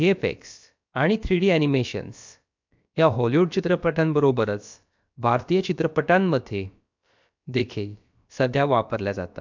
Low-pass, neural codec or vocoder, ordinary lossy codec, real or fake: 7.2 kHz; codec, 16 kHz, about 1 kbps, DyCAST, with the encoder's durations; MP3, 64 kbps; fake